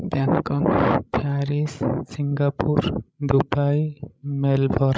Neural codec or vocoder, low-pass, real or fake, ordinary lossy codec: codec, 16 kHz, 16 kbps, FreqCodec, larger model; none; fake; none